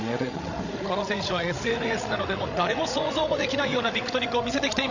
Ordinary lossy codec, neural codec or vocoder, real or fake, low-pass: none; codec, 16 kHz, 16 kbps, FreqCodec, larger model; fake; 7.2 kHz